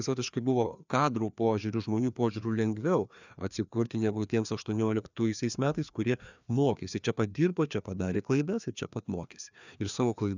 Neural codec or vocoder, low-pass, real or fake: codec, 16 kHz, 2 kbps, FreqCodec, larger model; 7.2 kHz; fake